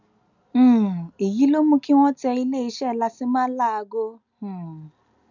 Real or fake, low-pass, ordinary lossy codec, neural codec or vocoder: real; 7.2 kHz; none; none